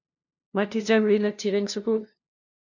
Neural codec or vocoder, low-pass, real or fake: codec, 16 kHz, 0.5 kbps, FunCodec, trained on LibriTTS, 25 frames a second; 7.2 kHz; fake